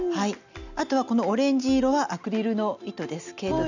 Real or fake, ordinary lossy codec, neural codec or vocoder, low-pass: real; none; none; 7.2 kHz